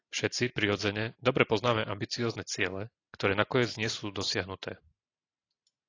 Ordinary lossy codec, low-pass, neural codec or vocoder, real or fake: AAC, 32 kbps; 7.2 kHz; none; real